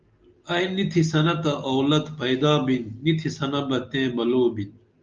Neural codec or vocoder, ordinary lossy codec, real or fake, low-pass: none; Opus, 24 kbps; real; 7.2 kHz